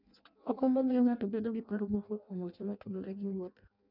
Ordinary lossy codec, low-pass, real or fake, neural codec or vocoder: none; 5.4 kHz; fake; codec, 16 kHz in and 24 kHz out, 0.6 kbps, FireRedTTS-2 codec